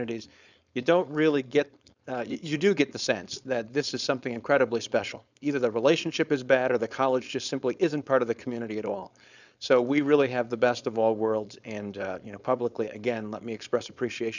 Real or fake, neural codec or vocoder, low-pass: fake; codec, 16 kHz, 4.8 kbps, FACodec; 7.2 kHz